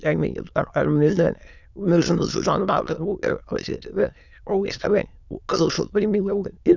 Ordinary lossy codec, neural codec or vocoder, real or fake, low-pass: none; autoencoder, 22.05 kHz, a latent of 192 numbers a frame, VITS, trained on many speakers; fake; 7.2 kHz